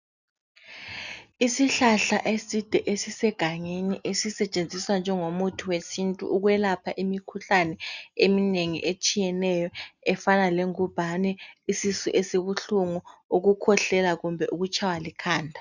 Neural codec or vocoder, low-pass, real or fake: none; 7.2 kHz; real